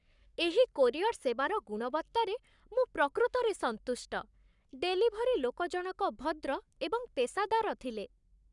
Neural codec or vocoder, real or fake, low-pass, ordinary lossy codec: codec, 44.1 kHz, 7.8 kbps, Pupu-Codec; fake; 10.8 kHz; none